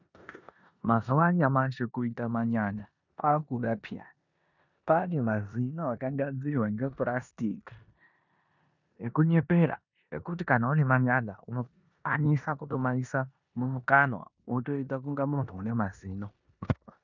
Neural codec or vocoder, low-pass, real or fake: codec, 16 kHz in and 24 kHz out, 0.9 kbps, LongCat-Audio-Codec, four codebook decoder; 7.2 kHz; fake